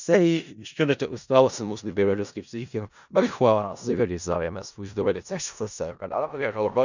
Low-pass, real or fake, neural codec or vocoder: 7.2 kHz; fake; codec, 16 kHz in and 24 kHz out, 0.4 kbps, LongCat-Audio-Codec, four codebook decoder